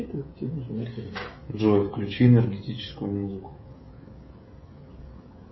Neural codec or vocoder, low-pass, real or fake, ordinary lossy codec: codec, 16 kHz, 16 kbps, FreqCodec, smaller model; 7.2 kHz; fake; MP3, 24 kbps